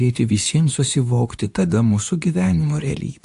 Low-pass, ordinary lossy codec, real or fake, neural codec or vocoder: 10.8 kHz; AAC, 64 kbps; fake; codec, 24 kHz, 0.9 kbps, WavTokenizer, medium speech release version 2